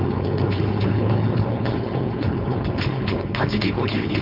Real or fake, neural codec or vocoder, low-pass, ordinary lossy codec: fake; codec, 16 kHz, 4.8 kbps, FACodec; 5.4 kHz; MP3, 48 kbps